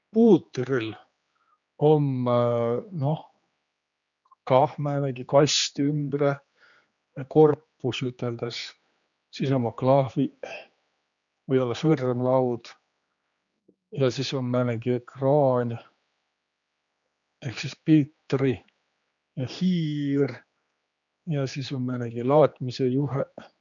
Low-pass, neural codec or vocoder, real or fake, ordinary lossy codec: 7.2 kHz; codec, 16 kHz, 2 kbps, X-Codec, HuBERT features, trained on general audio; fake; none